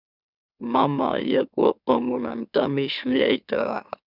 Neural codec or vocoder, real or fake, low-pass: autoencoder, 44.1 kHz, a latent of 192 numbers a frame, MeloTTS; fake; 5.4 kHz